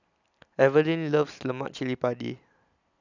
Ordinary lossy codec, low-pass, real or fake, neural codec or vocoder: none; 7.2 kHz; real; none